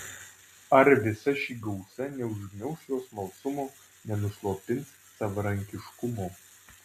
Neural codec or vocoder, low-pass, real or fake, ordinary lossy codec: none; 14.4 kHz; real; MP3, 64 kbps